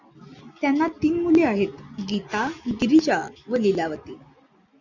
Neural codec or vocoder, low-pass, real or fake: none; 7.2 kHz; real